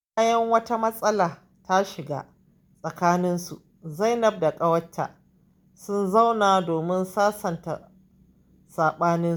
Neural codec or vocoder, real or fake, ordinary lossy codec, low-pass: none; real; none; none